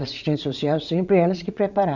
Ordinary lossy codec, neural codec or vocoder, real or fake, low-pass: none; vocoder, 22.05 kHz, 80 mel bands, Vocos; fake; 7.2 kHz